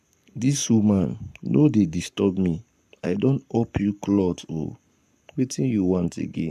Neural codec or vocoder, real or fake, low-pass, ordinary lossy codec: codec, 44.1 kHz, 7.8 kbps, Pupu-Codec; fake; 14.4 kHz; none